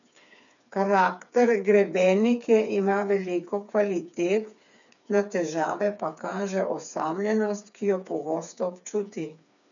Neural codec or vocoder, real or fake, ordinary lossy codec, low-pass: codec, 16 kHz, 4 kbps, FreqCodec, smaller model; fake; none; 7.2 kHz